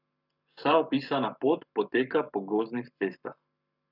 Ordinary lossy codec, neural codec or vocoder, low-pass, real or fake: none; codec, 44.1 kHz, 7.8 kbps, Pupu-Codec; 5.4 kHz; fake